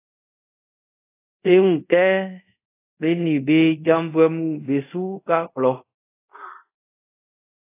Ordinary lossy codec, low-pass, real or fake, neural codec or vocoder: AAC, 24 kbps; 3.6 kHz; fake; codec, 24 kHz, 0.5 kbps, DualCodec